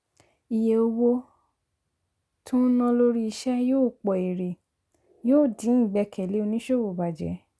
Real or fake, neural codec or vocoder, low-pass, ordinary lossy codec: real; none; none; none